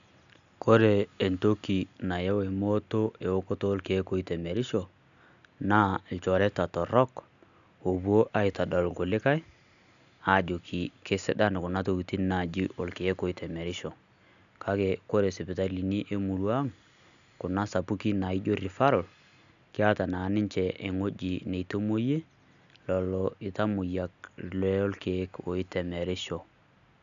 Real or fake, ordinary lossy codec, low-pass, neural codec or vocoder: real; none; 7.2 kHz; none